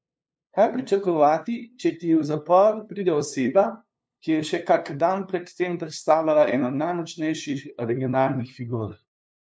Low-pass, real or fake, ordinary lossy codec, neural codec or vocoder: none; fake; none; codec, 16 kHz, 2 kbps, FunCodec, trained on LibriTTS, 25 frames a second